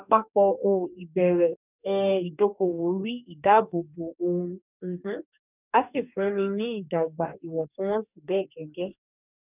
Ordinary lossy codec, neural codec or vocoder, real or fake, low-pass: none; codec, 44.1 kHz, 2.6 kbps, DAC; fake; 3.6 kHz